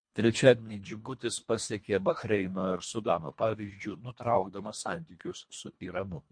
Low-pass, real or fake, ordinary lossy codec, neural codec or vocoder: 9.9 kHz; fake; MP3, 48 kbps; codec, 24 kHz, 1.5 kbps, HILCodec